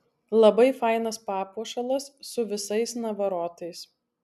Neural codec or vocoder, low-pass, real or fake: none; 14.4 kHz; real